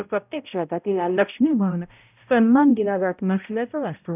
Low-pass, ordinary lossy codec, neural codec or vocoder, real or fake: 3.6 kHz; none; codec, 16 kHz, 0.5 kbps, X-Codec, HuBERT features, trained on balanced general audio; fake